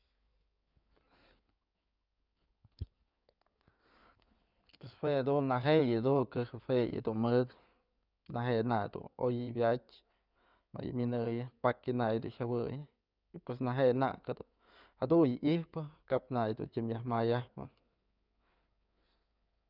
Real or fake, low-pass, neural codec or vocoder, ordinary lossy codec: fake; 5.4 kHz; codec, 16 kHz in and 24 kHz out, 2.2 kbps, FireRedTTS-2 codec; none